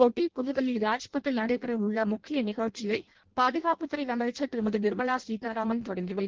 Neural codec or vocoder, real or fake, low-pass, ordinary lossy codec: codec, 16 kHz in and 24 kHz out, 0.6 kbps, FireRedTTS-2 codec; fake; 7.2 kHz; Opus, 16 kbps